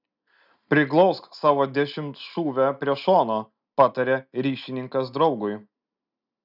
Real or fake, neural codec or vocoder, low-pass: real; none; 5.4 kHz